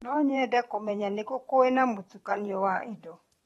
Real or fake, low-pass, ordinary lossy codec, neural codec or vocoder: fake; 19.8 kHz; AAC, 32 kbps; vocoder, 44.1 kHz, 128 mel bands every 512 samples, BigVGAN v2